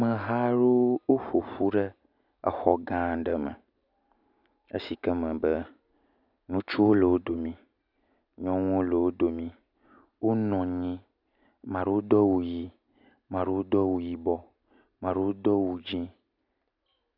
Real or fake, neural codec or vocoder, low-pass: real; none; 5.4 kHz